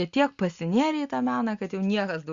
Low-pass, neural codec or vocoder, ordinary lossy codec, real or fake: 7.2 kHz; none; Opus, 64 kbps; real